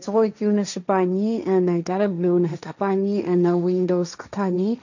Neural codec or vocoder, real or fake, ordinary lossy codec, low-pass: codec, 16 kHz, 1.1 kbps, Voila-Tokenizer; fake; none; 7.2 kHz